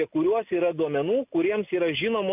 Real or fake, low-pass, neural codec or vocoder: real; 3.6 kHz; none